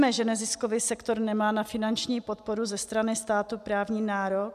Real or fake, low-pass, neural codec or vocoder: real; 14.4 kHz; none